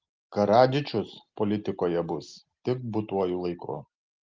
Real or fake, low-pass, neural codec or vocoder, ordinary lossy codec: real; 7.2 kHz; none; Opus, 24 kbps